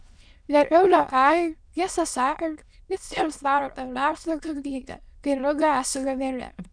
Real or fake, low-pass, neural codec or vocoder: fake; 9.9 kHz; autoencoder, 22.05 kHz, a latent of 192 numbers a frame, VITS, trained on many speakers